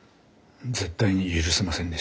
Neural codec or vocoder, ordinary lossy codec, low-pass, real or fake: none; none; none; real